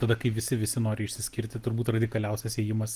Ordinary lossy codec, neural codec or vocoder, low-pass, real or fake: Opus, 24 kbps; none; 14.4 kHz; real